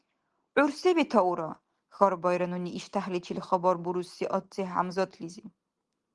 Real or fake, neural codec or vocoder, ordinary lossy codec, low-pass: real; none; Opus, 24 kbps; 10.8 kHz